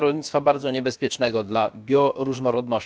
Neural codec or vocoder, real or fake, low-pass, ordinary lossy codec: codec, 16 kHz, about 1 kbps, DyCAST, with the encoder's durations; fake; none; none